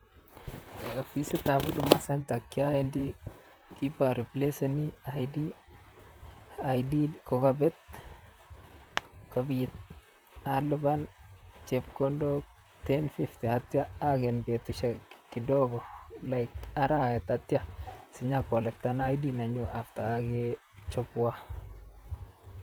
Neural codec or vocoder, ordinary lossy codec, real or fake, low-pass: vocoder, 44.1 kHz, 128 mel bands, Pupu-Vocoder; none; fake; none